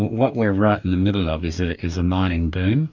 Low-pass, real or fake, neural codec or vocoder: 7.2 kHz; fake; codec, 44.1 kHz, 2.6 kbps, DAC